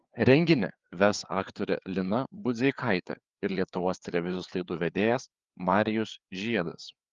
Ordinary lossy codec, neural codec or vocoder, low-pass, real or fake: Opus, 32 kbps; codec, 16 kHz, 4 kbps, FreqCodec, larger model; 7.2 kHz; fake